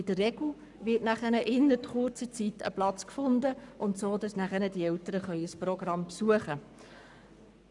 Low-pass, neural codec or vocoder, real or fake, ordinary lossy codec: 10.8 kHz; codec, 44.1 kHz, 7.8 kbps, Pupu-Codec; fake; none